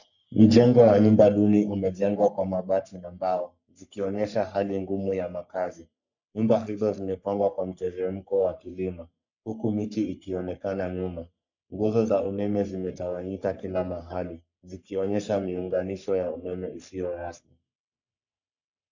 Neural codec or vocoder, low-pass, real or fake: codec, 44.1 kHz, 3.4 kbps, Pupu-Codec; 7.2 kHz; fake